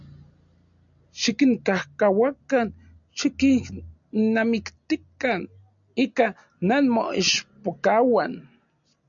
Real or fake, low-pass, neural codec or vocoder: real; 7.2 kHz; none